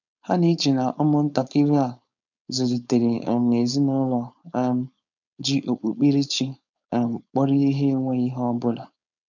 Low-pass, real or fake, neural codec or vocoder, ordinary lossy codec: 7.2 kHz; fake; codec, 16 kHz, 4.8 kbps, FACodec; none